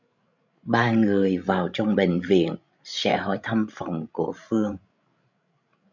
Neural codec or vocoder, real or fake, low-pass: codec, 16 kHz, 16 kbps, FreqCodec, larger model; fake; 7.2 kHz